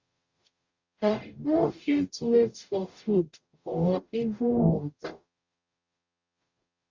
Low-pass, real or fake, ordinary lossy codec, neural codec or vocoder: 7.2 kHz; fake; Opus, 64 kbps; codec, 44.1 kHz, 0.9 kbps, DAC